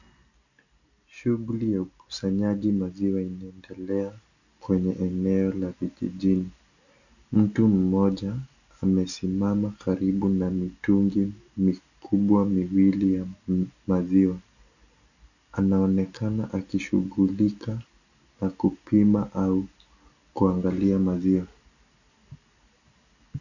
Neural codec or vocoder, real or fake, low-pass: none; real; 7.2 kHz